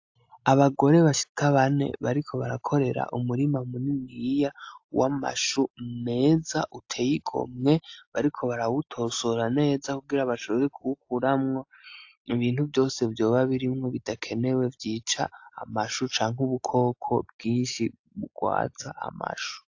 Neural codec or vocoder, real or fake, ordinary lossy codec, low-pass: none; real; AAC, 48 kbps; 7.2 kHz